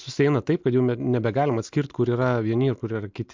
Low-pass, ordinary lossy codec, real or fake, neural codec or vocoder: 7.2 kHz; MP3, 64 kbps; real; none